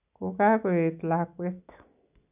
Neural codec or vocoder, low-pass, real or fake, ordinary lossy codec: none; 3.6 kHz; real; none